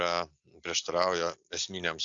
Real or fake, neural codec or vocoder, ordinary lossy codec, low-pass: real; none; AAC, 64 kbps; 7.2 kHz